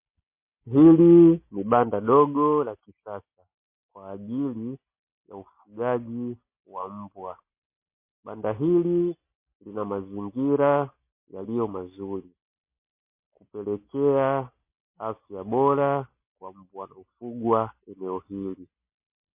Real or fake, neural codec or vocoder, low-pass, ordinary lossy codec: real; none; 3.6 kHz; MP3, 24 kbps